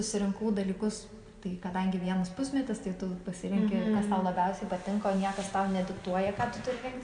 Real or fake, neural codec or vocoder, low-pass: real; none; 9.9 kHz